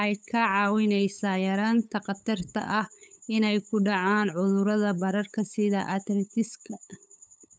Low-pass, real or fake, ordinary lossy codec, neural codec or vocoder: none; fake; none; codec, 16 kHz, 8 kbps, FunCodec, trained on LibriTTS, 25 frames a second